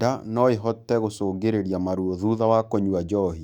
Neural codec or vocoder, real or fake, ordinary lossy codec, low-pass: autoencoder, 48 kHz, 128 numbers a frame, DAC-VAE, trained on Japanese speech; fake; Opus, 64 kbps; 19.8 kHz